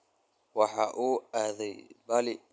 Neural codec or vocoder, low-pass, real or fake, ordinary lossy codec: none; none; real; none